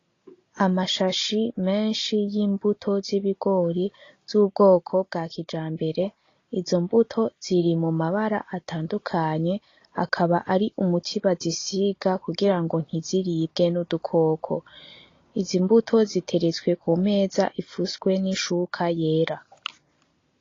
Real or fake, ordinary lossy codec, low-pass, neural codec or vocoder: real; AAC, 32 kbps; 7.2 kHz; none